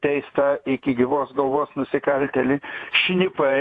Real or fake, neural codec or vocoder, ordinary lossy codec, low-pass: fake; vocoder, 48 kHz, 128 mel bands, Vocos; AAC, 48 kbps; 10.8 kHz